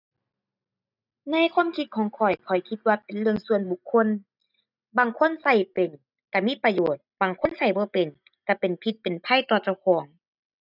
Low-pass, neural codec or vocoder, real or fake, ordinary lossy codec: 5.4 kHz; none; real; none